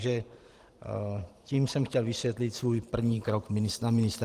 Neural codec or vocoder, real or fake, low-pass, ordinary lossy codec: vocoder, 44.1 kHz, 128 mel bands every 512 samples, BigVGAN v2; fake; 14.4 kHz; Opus, 24 kbps